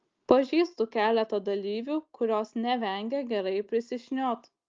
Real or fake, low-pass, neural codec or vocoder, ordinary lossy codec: real; 7.2 kHz; none; Opus, 32 kbps